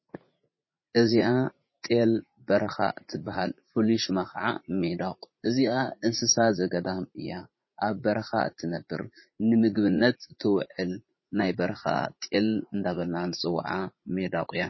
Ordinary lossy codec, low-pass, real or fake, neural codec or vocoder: MP3, 24 kbps; 7.2 kHz; fake; vocoder, 44.1 kHz, 80 mel bands, Vocos